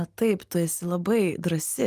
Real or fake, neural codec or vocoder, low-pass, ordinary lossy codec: real; none; 14.4 kHz; Opus, 32 kbps